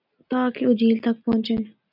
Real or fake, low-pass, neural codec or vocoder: real; 5.4 kHz; none